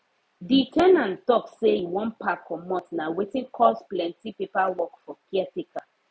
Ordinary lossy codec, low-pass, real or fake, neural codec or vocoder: none; none; real; none